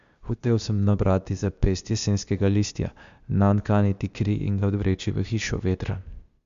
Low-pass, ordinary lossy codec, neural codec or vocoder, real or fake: 7.2 kHz; Opus, 64 kbps; codec, 16 kHz, 0.8 kbps, ZipCodec; fake